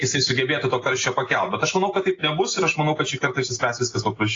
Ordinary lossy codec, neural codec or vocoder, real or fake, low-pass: AAC, 32 kbps; none; real; 7.2 kHz